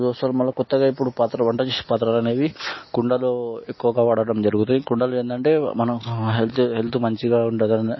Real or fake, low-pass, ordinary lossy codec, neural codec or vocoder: real; 7.2 kHz; MP3, 24 kbps; none